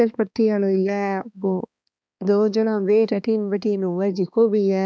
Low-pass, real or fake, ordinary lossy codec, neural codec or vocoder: none; fake; none; codec, 16 kHz, 2 kbps, X-Codec, HuBERT features, trained on balanced general audio